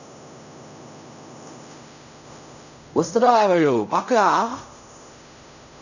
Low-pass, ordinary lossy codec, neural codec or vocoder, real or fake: 7.2 kHz; none; codec, 16 kHz in and 24 kHz out, 0.4 kbps, LongCat-Audio-Codec, fine tuned four codebook decoder; fake